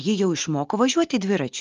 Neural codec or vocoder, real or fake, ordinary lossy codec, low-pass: none; real; Opus, 32 kbps; 7.2 kHz